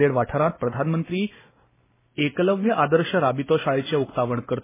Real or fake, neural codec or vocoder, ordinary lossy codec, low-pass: real; none; MP3, 16 kbps; 3.6 kHz